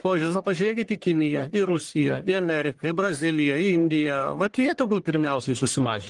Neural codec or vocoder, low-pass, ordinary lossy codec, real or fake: codec, 44.1 kHz, 1.7 kbps, Pupu-Codec; 10.8 kHz; Opus, 24 kbps; fake